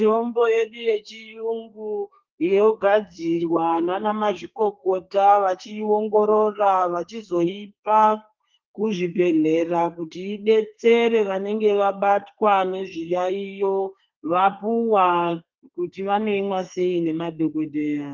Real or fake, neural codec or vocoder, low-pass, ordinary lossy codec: fake; codec, 32 kHz, 1.9 kbps, SNAC; 7.2 kHz; Opus, 24 kbps